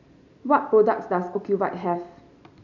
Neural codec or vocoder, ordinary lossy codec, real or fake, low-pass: none; none; real; 7.2 kHz